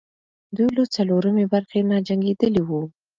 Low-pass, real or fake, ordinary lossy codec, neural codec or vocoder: 7.2 kHz; real; Opus, 32 kbps; none